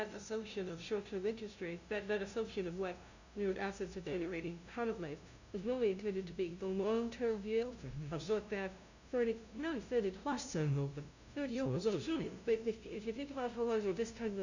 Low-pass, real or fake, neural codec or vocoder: 7.2 kHz; fake; codec, 16 kHz, 0.5 kbps, FunCodec, trained on LibriTTS, 25 frames a second